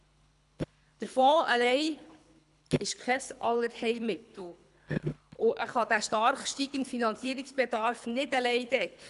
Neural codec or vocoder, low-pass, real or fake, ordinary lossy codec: codec, 24 kHz, 3 kbps, HILCodec; 10.8 kHz; fake; none